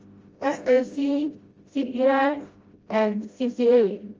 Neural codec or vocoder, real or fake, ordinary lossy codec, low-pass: codec, 16 kHz, 0.5 kbps, FreqCodec, smaller model; fake; Opus, 32 kbps; 7.2 kHz